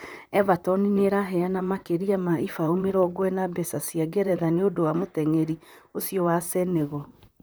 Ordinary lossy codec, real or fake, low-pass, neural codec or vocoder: none; fake; none; vocoder, 44.1 kHz, 128 mel bands, Pupu-Vocoder